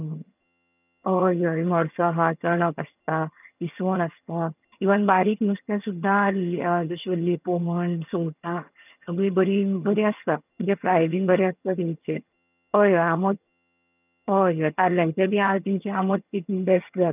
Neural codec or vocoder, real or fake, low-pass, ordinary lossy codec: vocoder, 22.05 kHz, 80 mel bands, HiFi-GAN; fake; 3.6 kHz; none